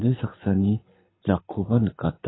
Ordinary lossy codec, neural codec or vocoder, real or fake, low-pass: AAC, 16 kbps; codec, 24 kHz, 6 kbps, HILCodec; fake; 7.2 kHz